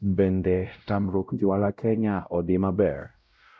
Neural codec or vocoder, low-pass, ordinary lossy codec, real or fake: codec, 16 kHz, 0.5 kbps, X-Codec, WavLM features, trained on Multilingual LibriSpeech; none; none; fake